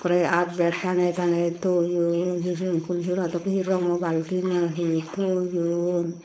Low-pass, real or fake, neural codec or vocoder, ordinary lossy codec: none; fake; codec, 16 kHz, 4.8 kbps, FACodec; none